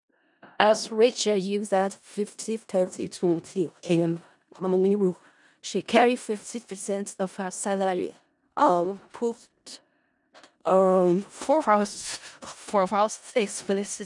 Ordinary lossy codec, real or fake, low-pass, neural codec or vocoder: none; fake; 10.8 kHz; codec, 16 kHz in and 24 kHz out, 0.4 kbps, LongCat-Audio-Codec, four codebook decoder